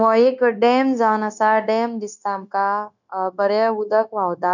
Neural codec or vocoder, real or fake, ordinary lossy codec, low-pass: codec, 16 kHz, 0.9 kbps, LongCat-Audio-Codec; fake; none; 7.2 kHz